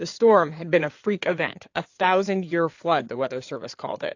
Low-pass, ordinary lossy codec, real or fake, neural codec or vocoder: 7.2 kHz; AAC, 48 kbps; fake; codec, 16 kHz in and 24 kHz out, 2.2 kbps, FireRedTTS-2 codec